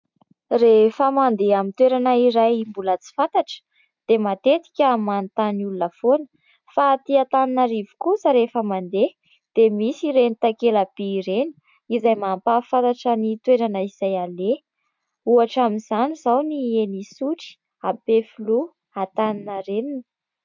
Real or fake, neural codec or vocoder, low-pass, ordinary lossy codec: real; none; 7.2 kHz; MP3, 64 kbps